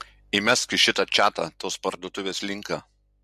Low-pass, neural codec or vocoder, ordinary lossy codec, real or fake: 14.4 kHz; none; MP3, 64 kbps; real